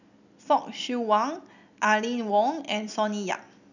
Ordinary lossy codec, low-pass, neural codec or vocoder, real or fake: none; 7.2 kHz; none; real